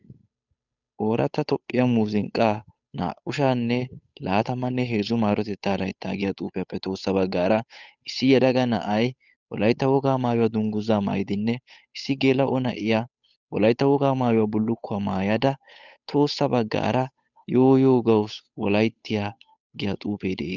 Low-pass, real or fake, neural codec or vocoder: 7.2 kHz; fake; codec, 16 kHz, 8 kbps, FunCodec, trained on Chinese and English, 25 frames a second